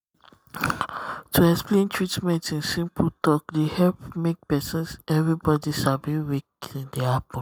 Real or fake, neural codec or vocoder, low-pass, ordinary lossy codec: real; none; none; none